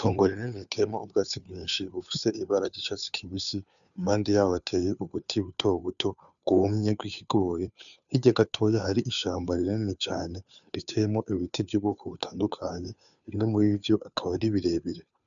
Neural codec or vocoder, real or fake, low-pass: codec, 16 kHz, 2 kbps, FunCodec, trained on Chinese and English, 25 frames a second; fake; 7.2 kHz